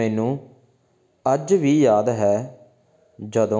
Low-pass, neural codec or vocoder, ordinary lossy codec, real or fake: none; none; none; real